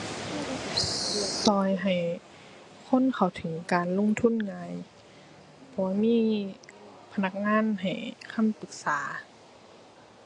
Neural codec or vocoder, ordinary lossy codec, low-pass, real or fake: none; AAC, 48 kbps; 10.8 kHz; real